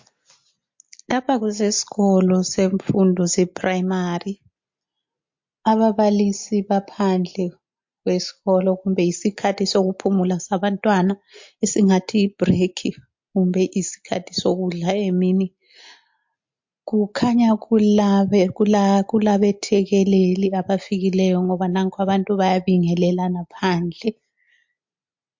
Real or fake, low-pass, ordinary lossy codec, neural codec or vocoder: real; 7.2 kHz; MP3, 48 kbps; none